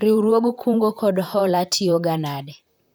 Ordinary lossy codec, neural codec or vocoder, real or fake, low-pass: none; vocoder, 44.1 kHz, 128 mel bands, Pupu-Vocoder; fake; none